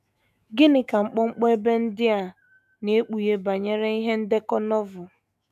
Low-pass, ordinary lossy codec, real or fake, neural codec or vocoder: 14.4 kHz; none; fake; autoencoder, 48 kHz, 128 numbers a frame, DAC-VAE, trained on Japanese speech